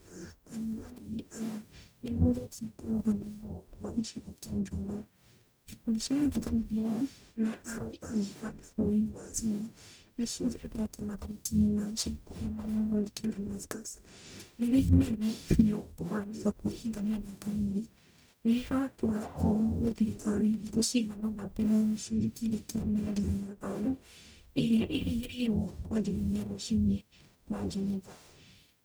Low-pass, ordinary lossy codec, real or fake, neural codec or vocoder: none; none; fake; codec, 44.1 kHz, 0.9 kbps, DAC